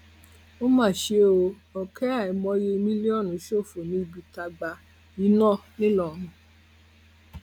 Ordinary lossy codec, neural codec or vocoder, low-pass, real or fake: none; none; 19.8 kHz; real